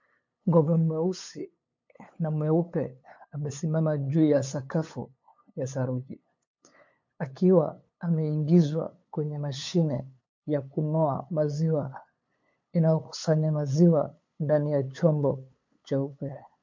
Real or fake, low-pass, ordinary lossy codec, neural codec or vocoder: fake; 7.2 kHz; MP3, 48 kbps; codec, 16 kHz, 8 kbps, FunCodec, trained on LibriTTS, 25 frames a second